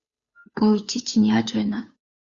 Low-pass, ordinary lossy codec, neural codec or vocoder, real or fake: 7.2 kHz; Opus, 64 kbps; codec, 16 kHz, 2 kbps, FunCodec, trained on Chinese and English, 25 frames a second; fake